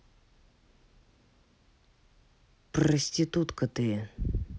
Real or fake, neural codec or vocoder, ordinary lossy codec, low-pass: real; none; none; none